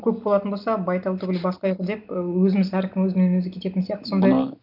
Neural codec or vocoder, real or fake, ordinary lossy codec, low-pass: none; real; none; 5.4 kHz